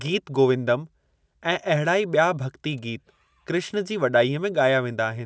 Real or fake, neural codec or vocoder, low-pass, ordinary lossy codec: real; none; none; none